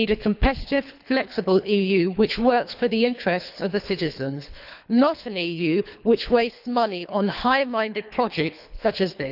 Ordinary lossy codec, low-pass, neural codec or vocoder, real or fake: none; 5.4 kHz; codec, 24 kHz, 3 kbps, HILCodec; fake